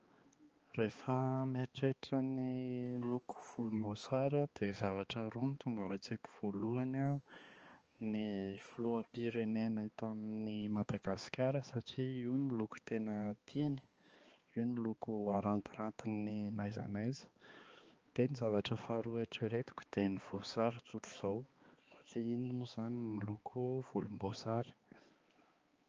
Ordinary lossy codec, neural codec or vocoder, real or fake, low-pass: Opus, 24 kbps; codec, 16 kHz, 2 kbps, X-Codec, HuBERT features, trained on balanced general audio; fake; 7.2 kHz